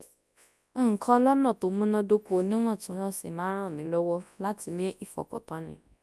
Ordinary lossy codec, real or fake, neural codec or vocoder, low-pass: none; fake; codec, 24 kHz, 0.9 kbps, WavTokenizer, large speech release; none